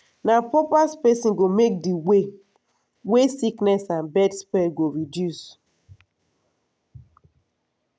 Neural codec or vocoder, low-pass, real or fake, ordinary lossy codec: none; none; real; none